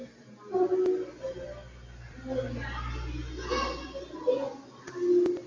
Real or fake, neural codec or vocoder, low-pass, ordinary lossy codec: real; none; 7.2 kHz; AAC, 32 kbps